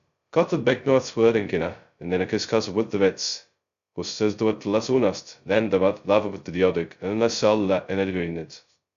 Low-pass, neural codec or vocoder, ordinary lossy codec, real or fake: 7.2 kHz; codec, 16 kHz, 0.2 kbps, FocalCodec; Opus, 64 kbps; fake